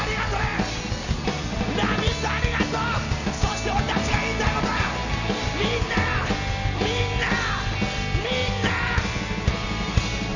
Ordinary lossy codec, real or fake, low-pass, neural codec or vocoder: none; real; 7.2 kHz; none